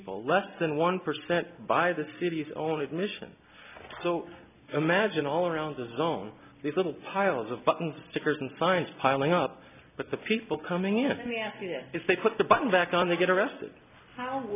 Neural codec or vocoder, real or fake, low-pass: none; real; 3.6 kHz